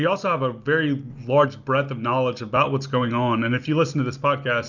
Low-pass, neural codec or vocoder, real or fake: 7.2 kHz; none; real